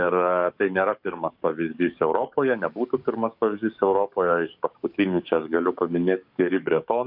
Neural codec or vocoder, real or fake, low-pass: codec, 44.1 kHz, 7.8 kbps, DAC; fake; 5.4 kHz